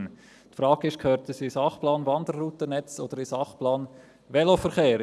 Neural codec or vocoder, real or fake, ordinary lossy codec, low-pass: none; real; none; none